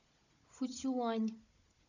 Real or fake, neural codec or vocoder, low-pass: fake; codec, 16 kHz, 16 kbps, FunCodec, trained on Chinese and English, 50 frames a second; 7.2 kHz